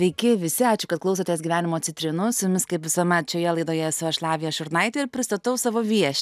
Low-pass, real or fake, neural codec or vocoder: 14.4 kHz; real; none